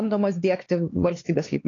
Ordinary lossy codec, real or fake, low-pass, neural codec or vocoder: AAC, 32 kbps; fake; 7.2 kHz; codec, 16 kHz, 4 kbps, X-Codec, HuBERT features, trained on LibriSpeech